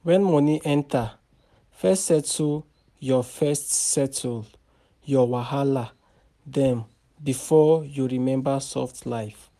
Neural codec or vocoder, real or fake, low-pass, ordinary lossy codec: none; real; 14.4 kHz; none